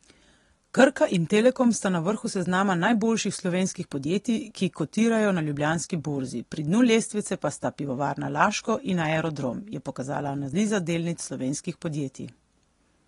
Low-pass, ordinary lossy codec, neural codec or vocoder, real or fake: 10.8 kHz; AAC, 32 kbps; none; real